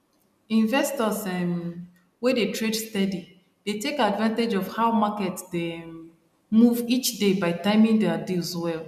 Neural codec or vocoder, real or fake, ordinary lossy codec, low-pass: none; real; none; 14.4 kHz